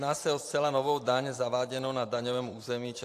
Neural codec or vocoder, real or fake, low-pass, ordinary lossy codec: vocoder, 44.1 kHz, 128 mel bands every 512 samples, BigVGAN v2; fake; 14.4 kHz; AAC, 64 kbps